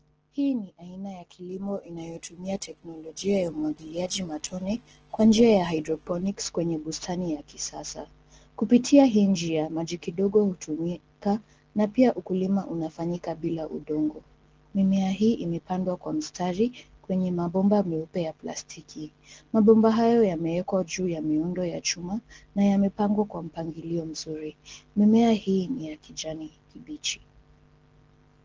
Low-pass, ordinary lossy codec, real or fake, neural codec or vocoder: 7.2 kHz; Opus, 16 kbps; real; none